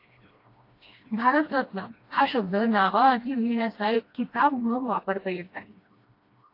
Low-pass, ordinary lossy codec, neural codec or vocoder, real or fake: 5.4 kHz; AAC, 32 kbps; codec, 16 kHz, 1 kbps, FreqCodec, smaller model; fake